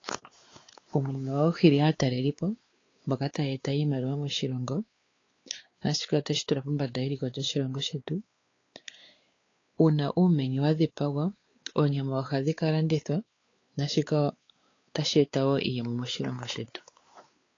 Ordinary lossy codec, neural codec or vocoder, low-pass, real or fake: AAC, 32 kbps; codec, 16 kHz, 4 kbps, X-Codec, WavLM features, trained on Multilingual LibriSpeech; 7.2 kHz; fake